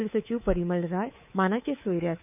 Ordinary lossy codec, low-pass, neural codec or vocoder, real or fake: none; 3.6 kHz; codec, 24 kHz, 3.1 kbps, DualCodec; fake